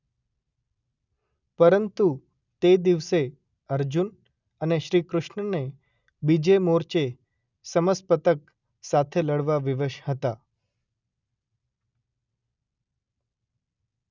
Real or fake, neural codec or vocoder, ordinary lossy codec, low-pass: real; none; none; 7.2 kHz